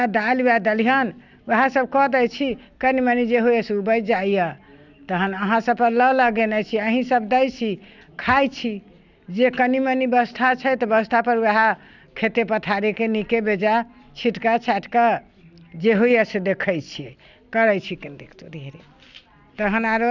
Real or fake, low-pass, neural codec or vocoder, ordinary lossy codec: real; 7.2 kHz; none; none